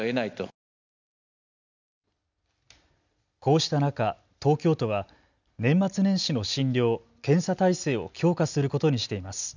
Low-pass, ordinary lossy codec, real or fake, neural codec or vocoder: 7.2 kHz; none; real; none